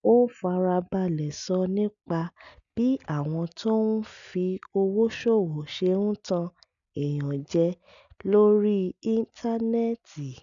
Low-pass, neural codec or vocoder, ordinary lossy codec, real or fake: 7.2 kHz; none; none; real